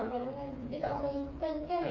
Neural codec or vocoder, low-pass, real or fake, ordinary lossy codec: codec, 16 kHz, 4 kbps, FreqCodec, smaller model; 7.2 kHz; fake; AAC, 48 kbps